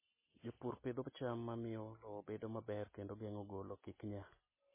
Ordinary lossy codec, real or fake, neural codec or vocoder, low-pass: MP3, 16 kbps; real; none; 3.6 kHz